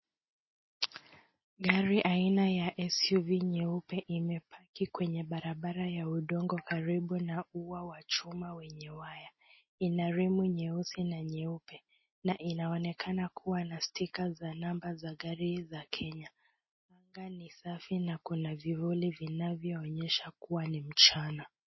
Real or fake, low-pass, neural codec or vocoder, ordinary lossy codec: real; 7.2 kHz; none; MP3, 24 kbps